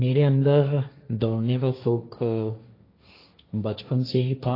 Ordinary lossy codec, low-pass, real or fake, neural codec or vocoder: AAC, 32 kbps; 5.4 kHz; fake; codec, 16 kHz, 1.1 kbps, Voila-Tokenizer